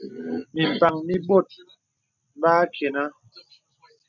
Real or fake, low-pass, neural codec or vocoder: real; 7.2 kHz; none